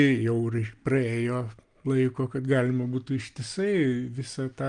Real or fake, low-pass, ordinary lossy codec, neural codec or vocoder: real; 9.9 kHz; Opus, 32 kbps; none